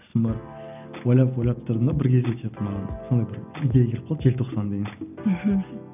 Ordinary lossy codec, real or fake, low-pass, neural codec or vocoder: none; real; 3.6 kHz; none